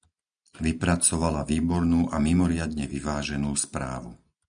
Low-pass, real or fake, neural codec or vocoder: 9.9 kHz; real; none